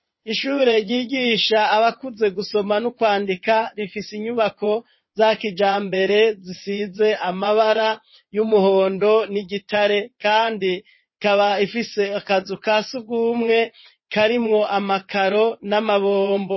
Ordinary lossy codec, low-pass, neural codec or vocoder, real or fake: MP3, 24 kbps; 7.2 kHz; vocoder, 22.05 kHz, 80 mel bands, WaveNeXt; fake